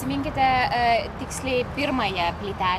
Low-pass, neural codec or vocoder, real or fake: 14.4 kHz; none; real